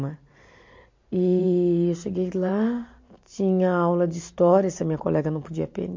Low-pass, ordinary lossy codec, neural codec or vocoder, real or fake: 7.2 kHz; MP3, 48 kbps; vocoder, 44.1 kHz, 128 mel bands every 512 samples, BigVGAN v2; fake